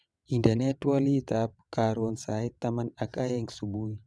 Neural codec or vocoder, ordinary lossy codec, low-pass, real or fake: vocoder, 22.05 kHz, 80 mel bands, WaveNeXt; none; none; fake